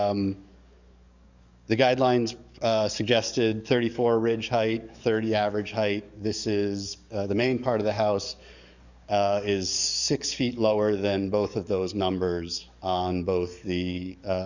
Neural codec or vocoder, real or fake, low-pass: codec, 44.1 kHz, 7.8 kbps, DAC; fake; 7.2 kHz